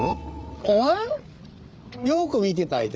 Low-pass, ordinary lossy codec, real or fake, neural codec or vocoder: none; none; fake; codec, 16 kHz, 8 kbps, FreqCodec, larger model